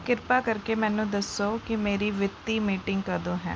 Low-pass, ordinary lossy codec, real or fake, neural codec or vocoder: none; none; real; none